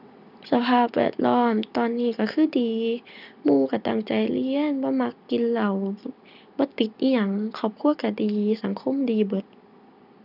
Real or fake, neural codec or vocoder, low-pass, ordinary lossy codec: real; none; 5.4 kHz; none